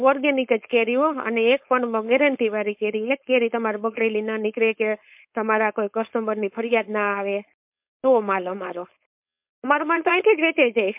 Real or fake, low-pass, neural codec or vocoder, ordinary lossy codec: fake; 3.6 kHz; codec, 16 kHz, 4.8 kbps, FACodec; MP3, 32 kbps